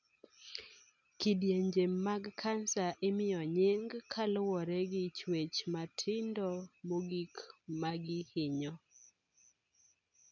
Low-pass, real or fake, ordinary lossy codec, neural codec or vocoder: 7.2 kHz; real; none; none